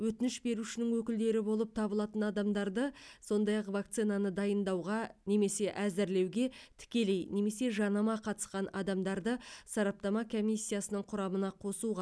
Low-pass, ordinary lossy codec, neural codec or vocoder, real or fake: none; none; none; real